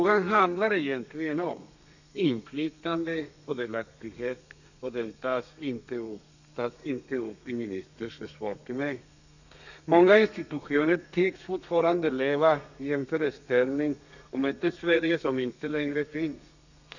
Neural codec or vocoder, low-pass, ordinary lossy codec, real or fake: codec, 32 kHz, 1.9 kbps, SNAC; 7.2 kHz; none; fake